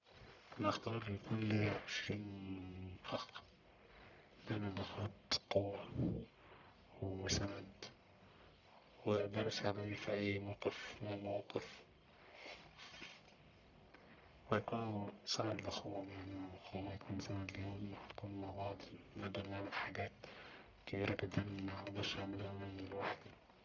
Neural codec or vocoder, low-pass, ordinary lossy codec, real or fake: codec, 44.1 kHz, 1.7 kbps, Pupu-Codec; 7.2 kHz; none; fake